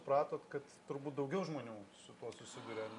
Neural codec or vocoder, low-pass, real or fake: none; 10.8 kHz; real